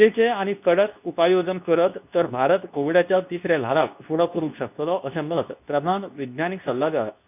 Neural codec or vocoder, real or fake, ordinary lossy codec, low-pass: codec, 24 kHz, 0.9 kbps, WavTokenizer, medium speech release version 2; fake; none; 3.6 kHz